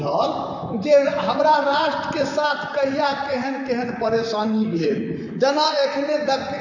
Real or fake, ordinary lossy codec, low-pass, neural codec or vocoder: fake; none; 7.2 kHz; vocoder, 44.1 kHz, 128 mel bands, Pupu-Vocoder